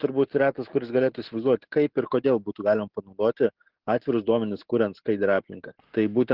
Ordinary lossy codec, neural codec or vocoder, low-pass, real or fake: Opus, 16 kbps; none; 5.4 kHz; real